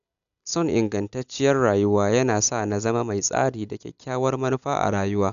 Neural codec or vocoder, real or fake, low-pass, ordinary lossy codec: none; real; 7.2 kHz; none